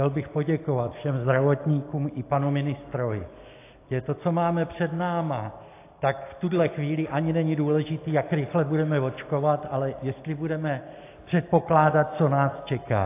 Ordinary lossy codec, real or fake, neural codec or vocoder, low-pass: AAC, 24 kbps; real; none; 3.6 kHz